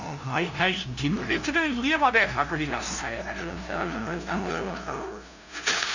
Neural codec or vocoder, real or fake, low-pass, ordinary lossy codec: codec, 16 kHz, 0.5 kbps, FunCodec, trained on LibriTTS, 25 frames a second; fake; 7.2 kHz; none